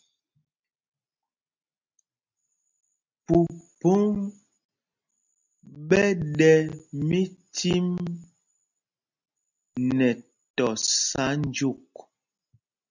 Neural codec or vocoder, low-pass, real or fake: none; 7.2 kHz; real